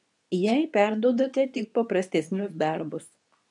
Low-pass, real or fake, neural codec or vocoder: 10.8 kHz; fake; codec, 24 kHz, 0.9 kbps, WavTokenizer, medium speech release version 2